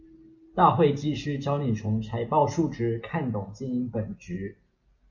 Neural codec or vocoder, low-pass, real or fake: none; 7.2 kHz; real